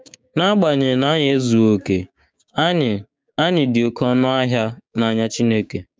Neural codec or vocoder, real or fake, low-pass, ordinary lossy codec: codec, 16 kHz, 6 kbps, DAC; fake; none; none